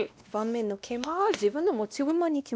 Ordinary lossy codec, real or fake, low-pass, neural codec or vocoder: none; fake; none; codec, 16 kHz, 1 kbps, X-Codec, WavLM features, trained on Multilingual LibriSpeech